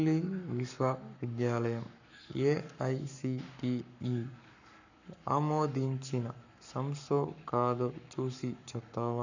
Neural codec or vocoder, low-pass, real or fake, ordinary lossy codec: codec, 44.1 kHz, 7.8 kbps, Pupu-Codec; 7.2 kHz; fake; none